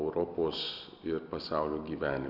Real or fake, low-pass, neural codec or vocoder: real; 5.4 kHz; none